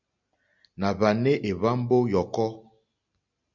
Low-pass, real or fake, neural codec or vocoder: 7.2 kHz; real; none